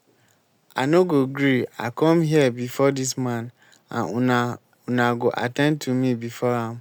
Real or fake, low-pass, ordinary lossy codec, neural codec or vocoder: real; none; none; none